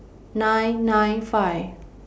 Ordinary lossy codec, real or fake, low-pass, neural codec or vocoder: none; real; none; none